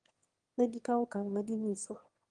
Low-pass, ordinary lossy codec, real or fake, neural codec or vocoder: 9.9 kHz; Opus, 24 kbps; fake; autoencoder, 22.05 kHz, a latent of 192 numbers a frame, VITS, trained on one speaker